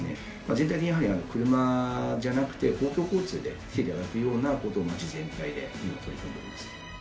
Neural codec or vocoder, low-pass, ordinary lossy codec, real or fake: none; none; none; real